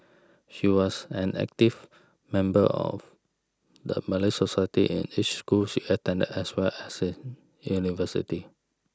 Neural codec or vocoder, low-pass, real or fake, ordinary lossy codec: none; none; real; none